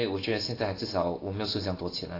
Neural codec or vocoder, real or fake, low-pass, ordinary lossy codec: none; real; 5.4 kHz; AAC, 24 kbps